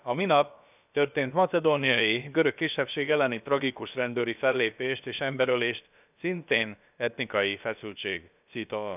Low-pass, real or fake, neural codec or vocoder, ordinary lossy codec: 3.6 kHz; fake; codec, 16 kHz, about 1 kbps, DyCAST, with the encoder's durations; none